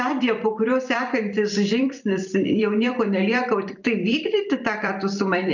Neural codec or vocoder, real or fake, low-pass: vocoder, 44.1 kHz, 128 mel bands every 256 samples, BigVGAN v2; fake; 7.2 kHz